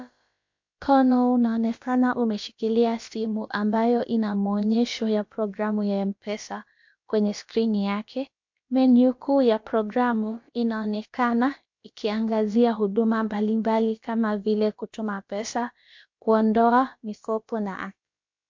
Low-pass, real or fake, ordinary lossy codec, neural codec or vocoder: 7.2 kHz; fake; MP3, 48 kbps; codec, 16 kHz, about 1 kbps, DyCAST, with the encoder's durations